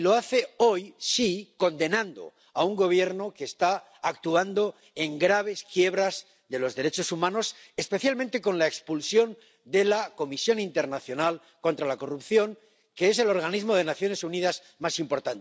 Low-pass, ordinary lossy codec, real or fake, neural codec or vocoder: none; none; real; none